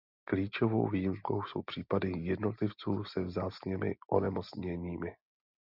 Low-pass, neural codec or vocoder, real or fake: 5.4 kHz; none; real